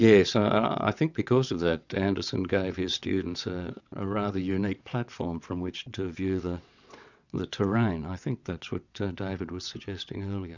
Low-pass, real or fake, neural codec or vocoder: 7.2 kHz; fake; vocoder, 22.05 kHz, 80 mel bands, WaveNeXt